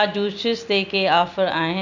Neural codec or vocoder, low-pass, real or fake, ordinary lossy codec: none; 7.2 kHz; real; none